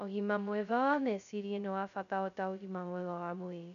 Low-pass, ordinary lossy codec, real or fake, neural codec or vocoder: 7.2 kHz; none; fake; codec, 16 kHz, 0.2 kbps, FocalCodec